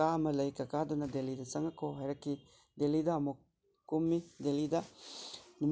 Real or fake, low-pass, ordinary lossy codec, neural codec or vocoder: real; none; none; none